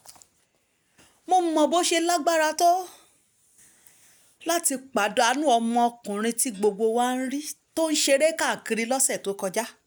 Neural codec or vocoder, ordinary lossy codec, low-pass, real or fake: none; none; none; real